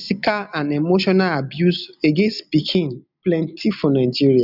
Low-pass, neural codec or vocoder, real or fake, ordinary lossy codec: 5.4 kHz; none; real; none